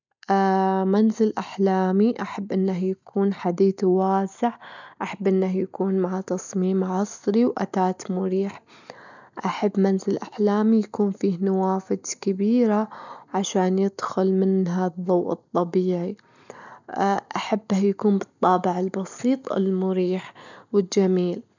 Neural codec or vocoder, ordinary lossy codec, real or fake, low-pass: none; none; real; 7.2 kHz